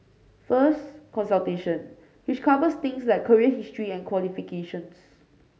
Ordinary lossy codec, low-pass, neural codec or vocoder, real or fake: none; none; none; real